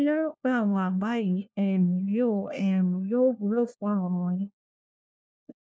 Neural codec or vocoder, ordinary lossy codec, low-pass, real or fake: codec, 16 kHz, 1 kbps, FunCodec, trained on LibriTTS, 50 frames a second; none; none; fake